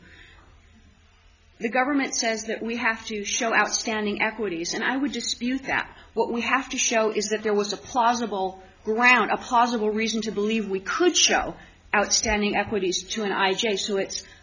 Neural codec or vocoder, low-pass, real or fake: none; 7.2 kHz; real